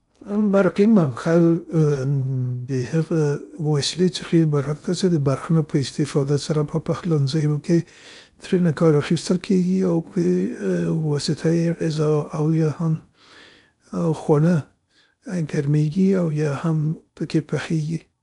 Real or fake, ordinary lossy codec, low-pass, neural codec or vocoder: fake; none; 10.8 kHz; codec, 16 kHz in and 24 kHz out, 0.6 kbps, FocalCodec, streaming, 2048 codes